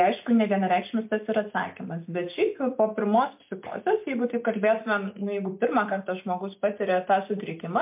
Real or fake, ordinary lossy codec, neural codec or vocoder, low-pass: real; MP3, 32 kbps; none; 3.6 kHz